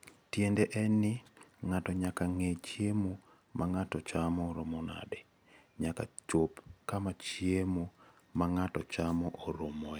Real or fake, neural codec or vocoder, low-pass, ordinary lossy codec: real; none; none; none